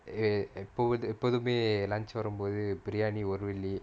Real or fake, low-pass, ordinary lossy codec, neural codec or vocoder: real; none; none; none